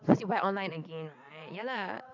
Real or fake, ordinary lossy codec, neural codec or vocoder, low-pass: fake; none; vocoder, 22.05 kHz, 80 mel bands, WaveNeXt; 7.2 kHz